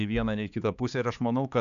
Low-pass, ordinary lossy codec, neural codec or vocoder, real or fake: 7.2 kHz; MP3, 96 kbps; codec, 16 kHz, 4 kbps, X-Codec, HuBERT features, trained on balanced general audio; fake